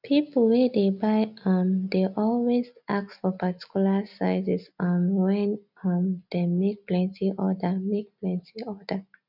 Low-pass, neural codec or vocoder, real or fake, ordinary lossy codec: 5.4 kHz; none; real; none